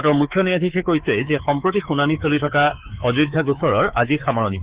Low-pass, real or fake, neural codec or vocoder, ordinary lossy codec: 3.6 kHz; fake; codec, 24 kHz, 3.1 kbps, DualCodec; Opus, 16 kbps